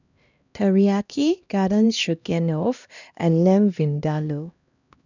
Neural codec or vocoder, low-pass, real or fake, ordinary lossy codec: codec, 16 kHz, 1 kbps, X-Codec, HuBERT features, trained on LibriSpeech; 7.2 kHz; fake; none